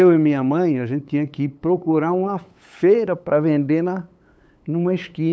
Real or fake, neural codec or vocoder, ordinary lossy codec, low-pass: fake; codec, 16 kHz, 8 kbps, FunCodec, trained on LibriTTS, 25 frames a second; none; none